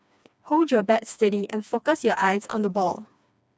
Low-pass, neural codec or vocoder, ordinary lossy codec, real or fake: none; codec, 16 kHz, 2 kbps, FreqCodec, smaller model; none; fake